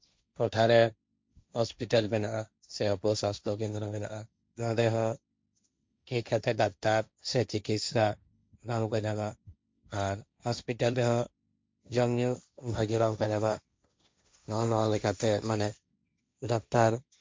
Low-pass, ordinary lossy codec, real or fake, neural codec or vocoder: none; none; fake; codec, 16 kHz, 1.1 kbps, Voila-Tokenizer